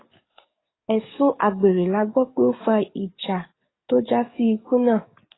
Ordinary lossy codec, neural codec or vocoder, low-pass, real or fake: AAC, 16 kbps; codec, 44.1 kHz, 7.8 kbps, DAC; 7.2 kHz; fake